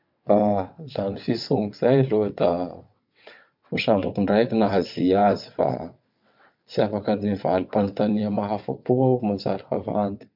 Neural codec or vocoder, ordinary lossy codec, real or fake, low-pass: vocoder, 22.05 kHz, 80 mel bands, WaveNeXt; MP3, 48 kbps; fake; 5.4 kHz